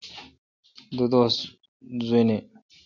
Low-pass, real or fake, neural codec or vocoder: 7.2 kHz; real; none